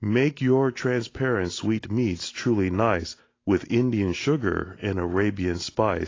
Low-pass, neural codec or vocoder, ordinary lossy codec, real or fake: 7.2 kHz; none; AAC, 32 kbps; real